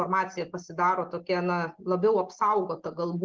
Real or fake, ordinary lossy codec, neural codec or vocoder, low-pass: real; Opus, 16 kbps; none; 7.2 kHz